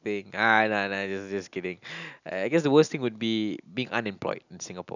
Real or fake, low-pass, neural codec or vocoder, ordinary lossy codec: real; 7.2 kHz; none; none